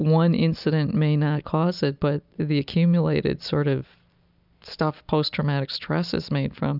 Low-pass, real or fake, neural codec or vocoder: 5.4 kHz; real; none